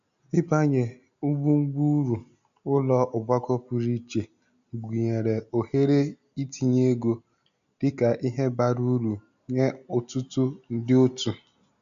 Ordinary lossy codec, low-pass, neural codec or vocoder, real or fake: none; 7.2 kHz; none; real